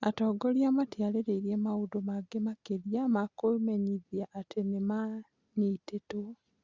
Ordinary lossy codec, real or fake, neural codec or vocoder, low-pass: Opus, 64 kbps; real; none; 7.2 kHz